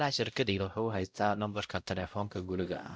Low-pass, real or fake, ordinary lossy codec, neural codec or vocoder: none; fake; none; codec, 16 kHz, 0.5 kbps, X-Codec, WavLM features, trained on Multilingual LibriSpeech